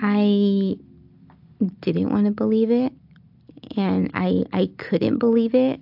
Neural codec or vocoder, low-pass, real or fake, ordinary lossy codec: none; 5.4 kHz; real; AAC, 48 kbps